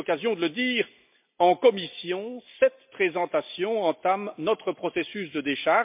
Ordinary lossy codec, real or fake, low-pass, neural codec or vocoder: MP3, 32 kbps; real; 3.6 kHz; none